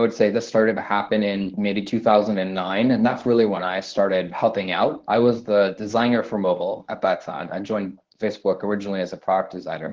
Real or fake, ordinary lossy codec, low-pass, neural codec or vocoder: fake; Opus, 16 kbps; 7.2 kHz; codec, 24 kHz, 0.9 kbps, WavTokenizer, medium speech release version 1